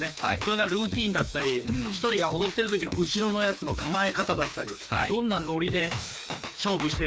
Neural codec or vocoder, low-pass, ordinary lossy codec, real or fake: codec, 16 kHz, 2 kbps, FreqCodec, larger model; none; none; fake